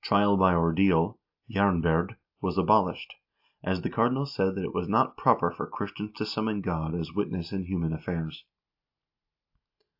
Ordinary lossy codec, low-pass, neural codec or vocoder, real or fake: AAC, 48 kbps; 5.4 kHz; none; real